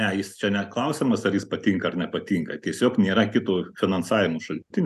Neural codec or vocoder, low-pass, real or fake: none; 14.4 kHz; real